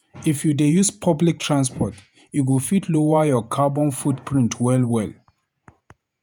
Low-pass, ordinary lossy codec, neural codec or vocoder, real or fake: none; none; vocoder, 48 kHz, 128 mel bands, Vocos; fake